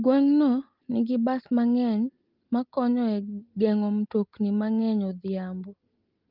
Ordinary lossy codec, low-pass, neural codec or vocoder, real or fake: Opus, 32 kbps; 5.4 kHz; none; real